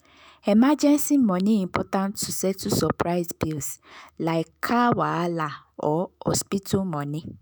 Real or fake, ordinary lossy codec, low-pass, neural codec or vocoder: fake; none; none; autoencoder, 48 kHz, 128 numbers a frame, DAC-VAE, trained on Japanese speech